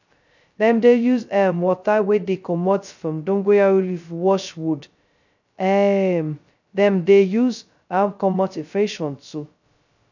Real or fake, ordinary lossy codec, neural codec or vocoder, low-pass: fake; none; codec, 16 kHz, 0.2 kbps, FocalCodec; 7.2 kHz